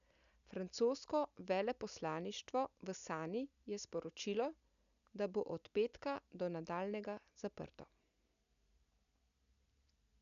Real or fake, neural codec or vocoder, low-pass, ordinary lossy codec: real; none; 7.2 kHz; none